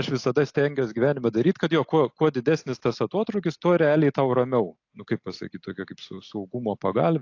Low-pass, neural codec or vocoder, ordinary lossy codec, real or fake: 7.2 kHz; none; AAC, 48 kbps; real